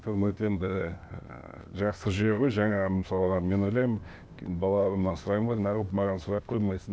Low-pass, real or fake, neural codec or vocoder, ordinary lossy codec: none; fake; codec, 16 kHz, 0.8 kbps, ZipCodec; none